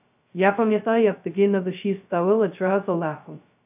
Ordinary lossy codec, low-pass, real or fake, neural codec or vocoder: AAC, 32 kbps; 3.6 kHz; fake; codec, 16 kHz, 0.2 kbps, FocalCodec